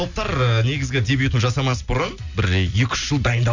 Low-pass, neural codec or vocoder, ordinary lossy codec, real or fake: 7.2 kHz; none; none; real